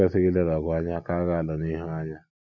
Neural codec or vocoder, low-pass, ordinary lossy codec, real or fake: none; 7.2 kHz; none; real